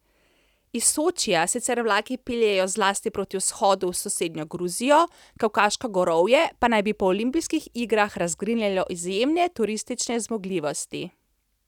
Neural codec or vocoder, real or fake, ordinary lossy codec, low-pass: none; real; none; 19.8 kHz